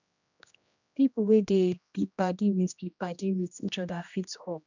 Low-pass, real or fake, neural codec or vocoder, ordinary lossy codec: 7.2 kHz; fake; codec, 16 kHz, 1 kbps, X-Codec, HuBERT features, trained on general audio; none